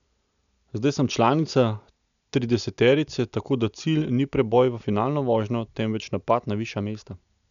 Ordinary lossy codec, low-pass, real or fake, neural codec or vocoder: none; 7.2 kHz; real; none